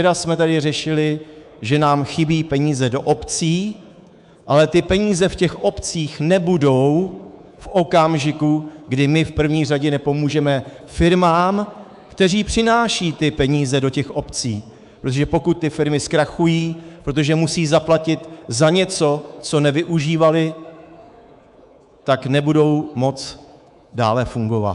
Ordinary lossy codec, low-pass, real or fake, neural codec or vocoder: AAC, 96 kbps; 10.8 kHz; fake; codec, 24 kHz, 3.1 kbps, DualCodec